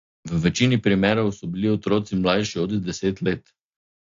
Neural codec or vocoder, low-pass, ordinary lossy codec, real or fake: none; 7.2 kHz; AAC, 48 kbps; real